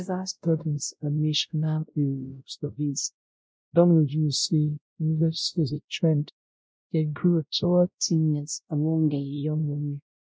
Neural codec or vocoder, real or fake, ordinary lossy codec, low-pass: codec, 16 kHz, 0.5 kbps, X-Codec, WavLM features, trained on Multilingual LibriSpeech; fake; none; none